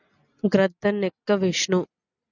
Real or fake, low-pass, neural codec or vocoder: real; 7.2 kHz; none